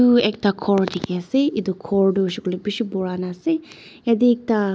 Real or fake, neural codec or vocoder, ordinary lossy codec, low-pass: real; none; none; none